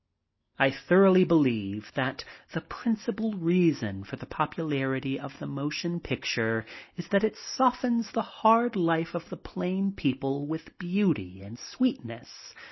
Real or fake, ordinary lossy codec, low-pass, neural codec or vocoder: real; MP3, 24 kbps; 7.2 kHz; none